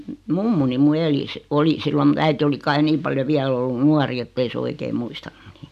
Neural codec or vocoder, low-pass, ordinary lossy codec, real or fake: none; 14.4 kHz; none; real